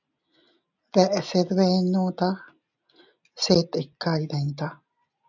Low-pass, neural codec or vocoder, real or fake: 7.2 kHz; none; real